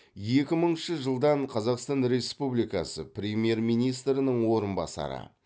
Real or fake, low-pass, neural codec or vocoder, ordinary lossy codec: real; none; none; none